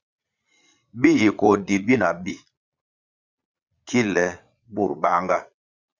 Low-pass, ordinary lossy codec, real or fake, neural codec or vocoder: 7.2 kHz; Opus, 64 kbps; fake; vocoder, 22.05 kHz, 80 mel bands, Vocos